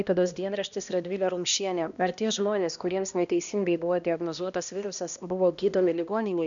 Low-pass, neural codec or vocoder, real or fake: 7.2 kHz; codec, 16 kHz, 1 kbps, X-Codec, HuBERT features, trained on balanced general audio; fake